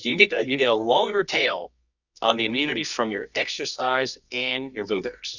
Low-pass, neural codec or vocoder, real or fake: 7.2 kHz; codec, 24 kHz, 0.9 kbps, WavTokenizer, medium music audio release; fake